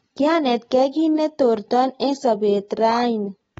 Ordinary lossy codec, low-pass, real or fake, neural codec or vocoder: AAC, 24 kbps; 19.8 kHz; real; none